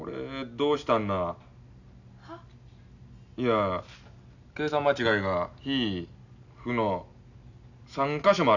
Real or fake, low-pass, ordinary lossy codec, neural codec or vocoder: real; 7.2 kHz; none; none